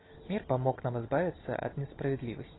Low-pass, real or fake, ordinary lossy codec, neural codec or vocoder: 7.2 kHz; real; AAC, 16 kbps; none